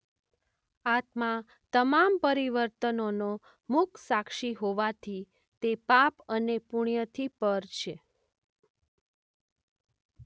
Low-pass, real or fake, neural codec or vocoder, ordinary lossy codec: none; real; none; none